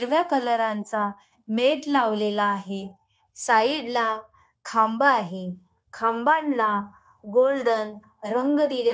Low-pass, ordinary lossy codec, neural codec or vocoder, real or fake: none; none; codec, 16 kHz, 0.9 kbps, LongCat-Audio-Codec; fake